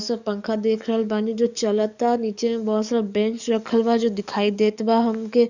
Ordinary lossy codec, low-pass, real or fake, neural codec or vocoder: none; 7.2 kHz; fake; codec, 44.1 kHz, 7.8 kbps, DAC